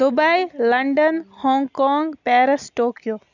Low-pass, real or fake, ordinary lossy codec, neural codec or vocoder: 7.2 kHz; real; none; none